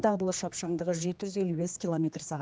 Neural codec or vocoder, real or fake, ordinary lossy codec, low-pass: codec, 16 kHz, 2 kbps, X-Codec, HuBERT features, trained on general audio; fake; none; none